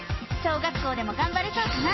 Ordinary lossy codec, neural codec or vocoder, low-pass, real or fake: MP3, 24 kbps; none; 7.2 kHz; real